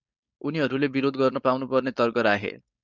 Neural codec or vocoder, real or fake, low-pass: codec, 16 kHz, 4.8 kbps, FACodec; fake; 7.2 kHz